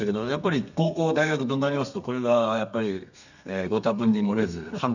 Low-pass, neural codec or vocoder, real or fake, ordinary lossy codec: 7.2 kHz; codec, 32 kHz, 1.9 kbps, SNAC; fake; none